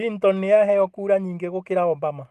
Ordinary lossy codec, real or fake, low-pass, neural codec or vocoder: Opus, 24 kbps; real; 14.4 kHz; none